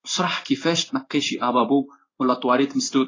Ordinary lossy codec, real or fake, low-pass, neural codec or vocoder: AAC, 32 kbps; fake; 7.2 kHz; codec, 16 kHz in and 24 kHz out, 1 kbps, XY-Tokenizer